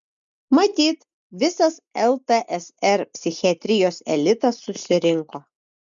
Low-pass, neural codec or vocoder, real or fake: 7.2 kHz; none; real